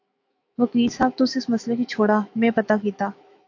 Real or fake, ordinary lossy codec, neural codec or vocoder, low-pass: fake; AAC, 48 kbps; autoencoder, 48 kHz, 128 numbers a frame, DAC-VAE, trained on Japanese speech; 7.2 kHz